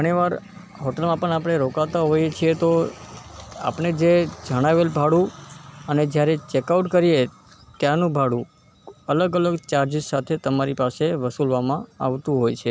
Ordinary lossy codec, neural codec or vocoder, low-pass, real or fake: none; none; none; real